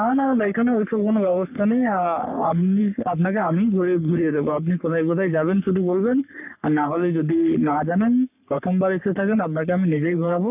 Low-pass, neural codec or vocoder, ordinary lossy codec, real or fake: 3.6 kHz; codec, 44.1 kHz, 3.4 kbps, Pupu-Codec; none; fake